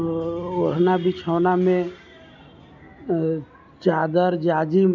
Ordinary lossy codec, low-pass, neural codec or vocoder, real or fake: none; 7.2 kHz; none; real